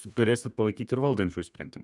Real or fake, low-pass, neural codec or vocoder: fake; 10.8 kHz; codec, 32 kHz, 1.9 kbps, SNAC